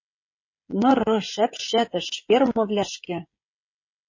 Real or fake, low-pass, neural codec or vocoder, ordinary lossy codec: fake; 7.2 kHz; codec, 16 kHz, 16 kbps, FreqCodec, smaller model; MP3, 32 kbps